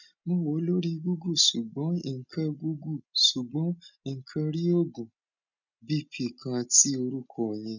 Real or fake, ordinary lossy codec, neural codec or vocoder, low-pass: real; none; none; 7.2 kHz